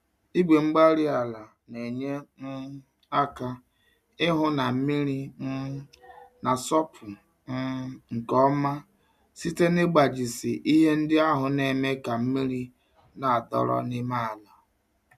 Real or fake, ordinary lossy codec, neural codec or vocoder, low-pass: real; MP3, 96 kbps; none; 14.4 kHz